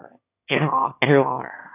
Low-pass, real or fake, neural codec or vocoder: 3.6 kHz; fake; autoencoder, 22.05 kHz, a latent of 192 numbers a frame, VITS, trained on one speaker